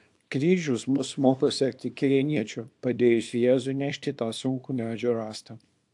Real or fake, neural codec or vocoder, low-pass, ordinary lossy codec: fake; codec, 24 kHz, 0.9 kbps, WavTokenizer, small release; 10.8 kHz; AAC, 64 kbps